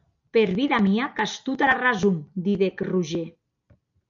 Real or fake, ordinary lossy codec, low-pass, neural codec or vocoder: real; MP3, 64 kbps; 7.2 kHz; none